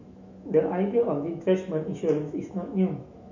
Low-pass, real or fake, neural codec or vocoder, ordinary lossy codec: 7.2 kHz; fake; autoencoder, 48 kHz, 128 numbers a frame, DAC-VAE, trained on Japanese speech; none